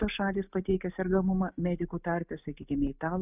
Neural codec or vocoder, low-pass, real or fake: none; 3.6 kHz; real